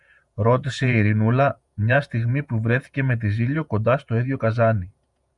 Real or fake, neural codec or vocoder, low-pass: fake; vocoder, 48 kHz, 128 mel bands, Vocos; 10.8 kHz